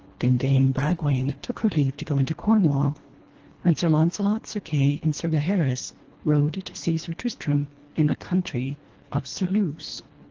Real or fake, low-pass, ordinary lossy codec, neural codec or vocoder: fake; 7.2 kHz; Opus, 16 kbps; codec, 24 kHz, 1.5 kbps, HILCodec